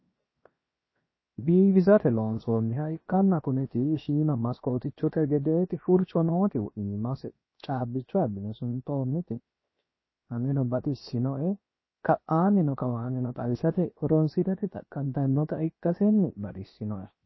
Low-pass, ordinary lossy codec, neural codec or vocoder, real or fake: 7.2 kHz; MP3, 24 kbps; codec, 16 kHz, 0.7 kbps, FocalCodec; fake